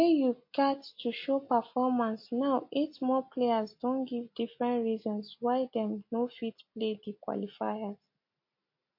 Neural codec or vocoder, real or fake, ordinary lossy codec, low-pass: none; real; MP3, 32 kbps; 5.4 kHz